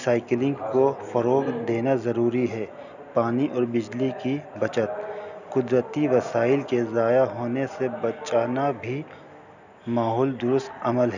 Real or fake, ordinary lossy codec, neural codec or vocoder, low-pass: real; none; none; 7.2 kHz